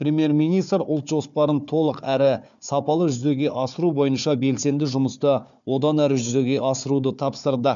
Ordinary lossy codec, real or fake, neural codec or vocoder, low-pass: none; fake; codec, 16 kHz, 4 kbps, FunCodec, trained on Chinese and English, 50 frames a second; 7.2 kHz